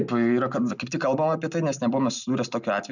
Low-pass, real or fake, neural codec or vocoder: 7.2 kHz; real; none